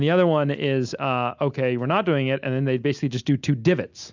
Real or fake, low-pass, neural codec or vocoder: real; 7.2 kHz; none